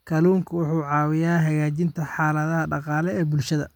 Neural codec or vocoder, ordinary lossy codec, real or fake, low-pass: none; none; real; 19.8 kHz